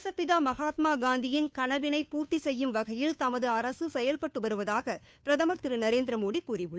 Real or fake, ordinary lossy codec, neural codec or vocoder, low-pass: fake; none; codec, 16 kHz, 2 kbps, FunCodec, trained on Chinese and English, 25 frames a second; none